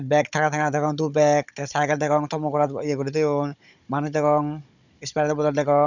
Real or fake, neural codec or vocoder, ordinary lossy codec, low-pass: fake; codec, 16 kHz, 16 kbps, FunCodec, trained on Chinese and English, 50 frames a second; none; 7.2 kHz